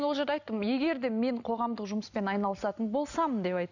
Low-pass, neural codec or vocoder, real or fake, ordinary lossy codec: 7.2 kHz; none; real; none